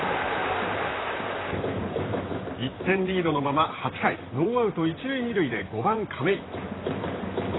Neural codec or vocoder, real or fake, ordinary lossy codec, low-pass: vocoder, 44.1 kHz, 128 mel bands, Pupu-Vocoder; fake; AAC, 16 kbps; 7.2 kHz